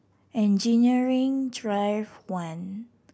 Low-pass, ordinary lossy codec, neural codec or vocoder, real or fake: none; none; none; real